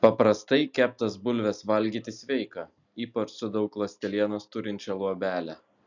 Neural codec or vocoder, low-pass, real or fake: none; 7.2 kHz; real